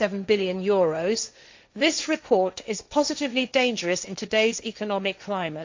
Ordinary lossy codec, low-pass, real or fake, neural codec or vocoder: MP3, 64 kbps; 7.2 kHz; fake; codec, 16 kHz, 1.1 kbps, Voila-Tokenizer